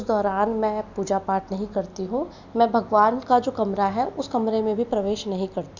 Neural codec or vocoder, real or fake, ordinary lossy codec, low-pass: none; real; none; 7.2 kHz